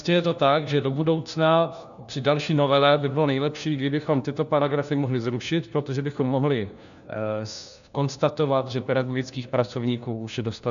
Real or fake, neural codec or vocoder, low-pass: fake; codec, 16 kHz, 1 kbps, FunCodec, trained on LibriTTS, 50 frames a second; 7.2 kHz